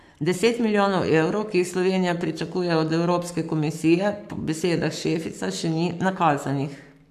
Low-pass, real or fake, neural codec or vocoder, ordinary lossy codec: 14.4 kHz; fake; codec, 44.1 kHz, 7.8 kbps, Pupu-Codec; AAC, 96 kbps